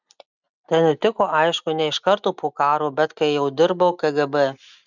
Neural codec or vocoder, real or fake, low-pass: none; real; 7.2 kHz